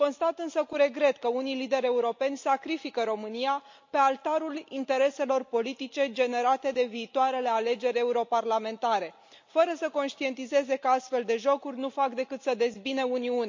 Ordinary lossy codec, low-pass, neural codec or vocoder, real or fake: MP3, 48 kbps; 7.2 kHz; none; real